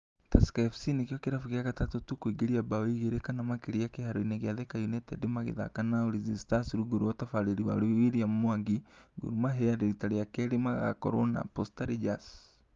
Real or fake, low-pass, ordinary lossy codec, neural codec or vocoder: real; 7.2 kHz; Opus, 32 kbps; none